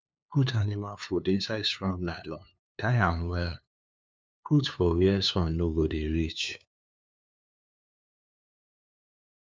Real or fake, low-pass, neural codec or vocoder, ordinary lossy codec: fake; none; codec, 16 kHz, 2 kbps, FunCodec, trained on LibriTTS, 25 frames a second; none